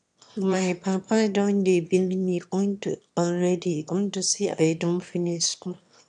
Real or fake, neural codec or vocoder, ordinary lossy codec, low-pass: fake; autoencoder, 22.05 kHz, a latent of 192 numbers a frame, VITS, trained on one speaker; none; 9.9 kHz